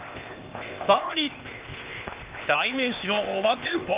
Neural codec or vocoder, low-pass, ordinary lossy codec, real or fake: codec, 16 kHz, 0.8 kbps, ZipCodec; 3.6 kHz; Opus, 32 kbps; fake